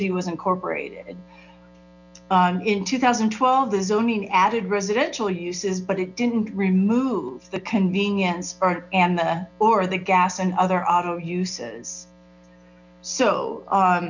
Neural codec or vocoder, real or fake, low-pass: none; real; 7.2 kHz